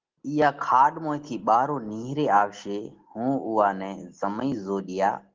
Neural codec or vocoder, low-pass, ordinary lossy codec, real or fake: none; 7.2 kHz; Opus, 16 kbps; real